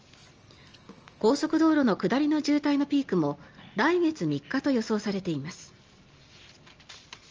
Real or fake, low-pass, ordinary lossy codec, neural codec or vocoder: real; 7.2 kHz; Opus, 24 kbps; none